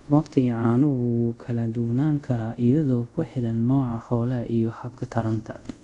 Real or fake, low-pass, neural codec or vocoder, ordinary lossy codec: fake; 10.8 kHz; codec, 24 kHz, 0.5 kbps, DualCodec; none